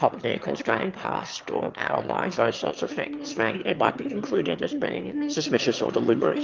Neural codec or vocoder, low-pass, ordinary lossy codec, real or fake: autoencoder, 22.05 kHz, a latent of 192 numbers a frame, VITS, trained on one speaker; 7.2 kHz; Opus, 32 kbps; fake